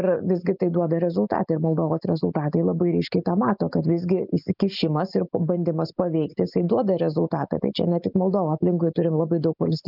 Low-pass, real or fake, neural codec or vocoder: 5.4 kHz; real; none